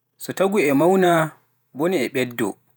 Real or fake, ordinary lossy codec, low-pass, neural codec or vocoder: fake; none; none; vocoder, 48 kHz, 128 mel bands, Vocos